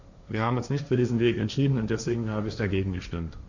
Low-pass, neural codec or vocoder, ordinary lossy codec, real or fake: 7.2 kHz; codec, 16 kHz, 1.1 kbps, Voila-Tokenizer; none; fake